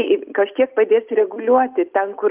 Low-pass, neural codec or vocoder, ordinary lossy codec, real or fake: 3.6 kHz; none; Opus, 24 kbps; real